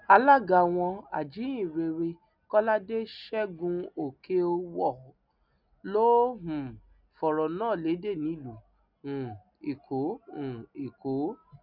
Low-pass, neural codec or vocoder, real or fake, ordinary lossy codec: 5.4 kHz; none; real; none